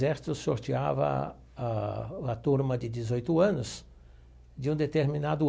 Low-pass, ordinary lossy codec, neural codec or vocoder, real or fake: none; none; none; real